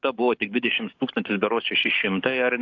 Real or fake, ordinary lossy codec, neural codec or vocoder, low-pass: fake; AAC, 48 kbps; codec, 44.1 kHz, 7.8 kbps, DAC; 7.2 kHz